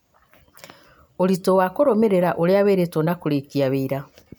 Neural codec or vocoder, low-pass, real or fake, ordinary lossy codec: none; none; real; none